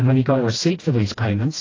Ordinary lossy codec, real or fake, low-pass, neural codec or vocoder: AAC, 32 kbps; fake; 7.2 kHz; codec, 16 kHz, 1 kbps, FreqCodec, smaller model